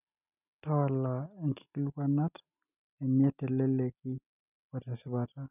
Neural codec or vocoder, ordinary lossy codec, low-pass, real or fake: none; none; 3.6 kHz; real